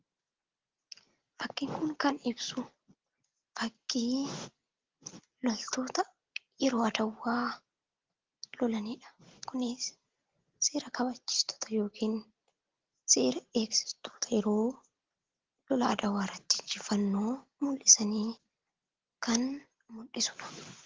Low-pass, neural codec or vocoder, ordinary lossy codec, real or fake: 7.2 kHz; none; Opus, 16 kbps; real